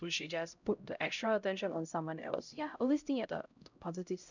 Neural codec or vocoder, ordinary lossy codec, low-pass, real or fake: codec, 16 kHz, 0.5 kbps, X-Codec, HuBERT features, trained on LibriSpeech; none; 7.2 kHz; fake